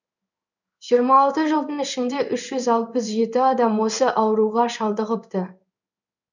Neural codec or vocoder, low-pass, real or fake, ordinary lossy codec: codec, 16 kHz in and 24 kHz out, 1 kbps, XY-Tokenizer; 7.2 kHz; fake; none